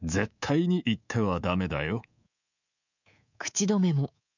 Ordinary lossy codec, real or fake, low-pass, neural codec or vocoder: none; fake; 7.2 kHz; codec, 24 kHz, 3.1 kbps, DualCodec